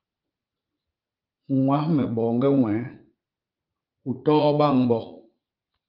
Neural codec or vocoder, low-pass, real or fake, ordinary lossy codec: vocoder, 44.1 kHz, 80 mel bands, Vocos; 5.4 kHz; fake; Opus, 32 kbps